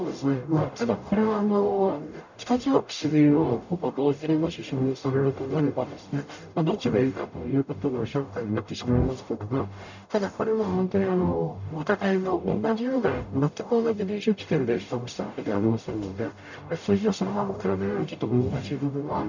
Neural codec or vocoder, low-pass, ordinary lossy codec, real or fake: codec, 44.1 kHz, 0.9 kbps, DAC; 7.2 kHz; none; fake